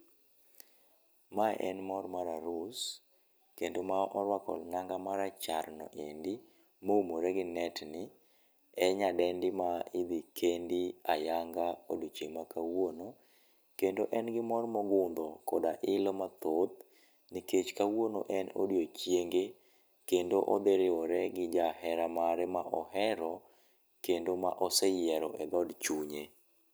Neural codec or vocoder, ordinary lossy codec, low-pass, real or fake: none; none; none; real